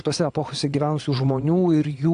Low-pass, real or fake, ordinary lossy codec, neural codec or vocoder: 9.9 kHz; fake; AAC, 64 kbps; vocoder, 22.05 kHz, 80 mel bands, Vocos